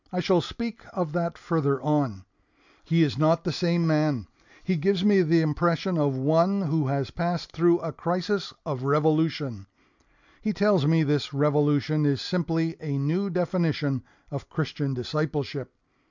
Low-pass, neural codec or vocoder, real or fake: 7.2 kHz; none; real